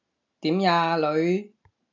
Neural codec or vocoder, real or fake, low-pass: none; real; 7.2 kHz